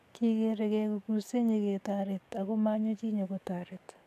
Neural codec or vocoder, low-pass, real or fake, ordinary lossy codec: codec, 44.1 kHz, 7.8 kbps, DAC; 14.4 kHz; fake; AAC, 96 kbps